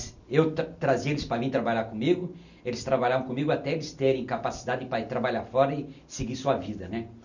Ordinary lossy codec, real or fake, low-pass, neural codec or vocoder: none; real; 7.2 kHz; none